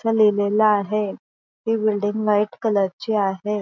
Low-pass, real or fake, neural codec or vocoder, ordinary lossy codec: 7.2 kHz; real; none; none